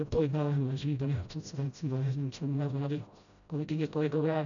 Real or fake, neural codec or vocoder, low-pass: fake; codec, 16 kHz, 0.5 kbps, FreqCodec, smaller model; 7.2 kHz